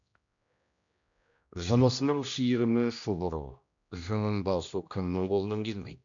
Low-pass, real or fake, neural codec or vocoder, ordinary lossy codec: 7.2 kHz; fake; codec, 16 kHz, 1 kbps, X-Codec, HuBERT features, trained on general audio; none